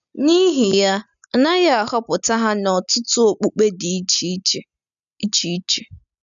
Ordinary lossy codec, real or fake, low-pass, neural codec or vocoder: none; real; 7.2 kHz; none